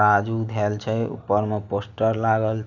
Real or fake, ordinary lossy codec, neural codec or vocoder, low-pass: real; none; none; 7.2 kHz